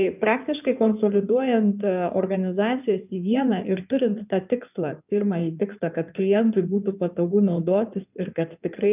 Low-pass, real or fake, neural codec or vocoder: 3.6 kHz; fake; codec, 16 kHz in and 24 kHz out, 2.2 kbps, FireRedTTS-2 codec